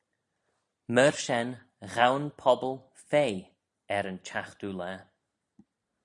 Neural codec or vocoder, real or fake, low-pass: none; real; 10.8 kHz